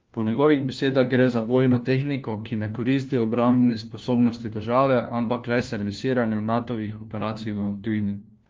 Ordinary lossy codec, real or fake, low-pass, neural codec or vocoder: Opus, 32 kbps; fake; 7.2 kHz; codec, 16 kHz, 1 kbps, FunCodec, trained on LibriTTS, 50 frames a second